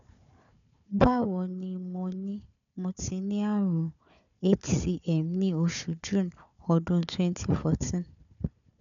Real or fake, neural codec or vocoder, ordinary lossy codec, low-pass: fake; codec, 16 kHz, 4 kbps, FunCodec, trained on Chinese and English, 50 frames a second; none; 7.2 kHz